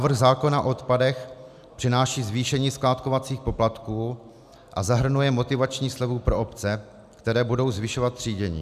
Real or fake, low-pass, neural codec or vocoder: real; 14.4 kHz; none